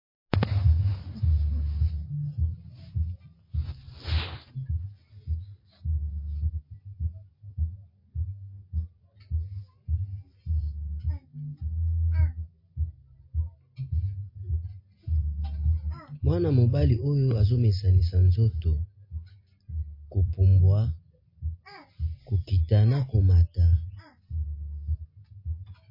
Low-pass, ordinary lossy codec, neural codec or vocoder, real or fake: 5.4 kHz; MP3, 24 kbps; none; real